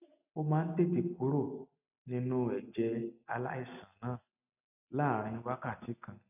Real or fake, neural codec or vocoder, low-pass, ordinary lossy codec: real; none; 3.6 kHz; none